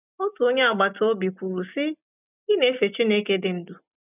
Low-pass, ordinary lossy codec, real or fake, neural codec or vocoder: 3.6 kHz; none; real; none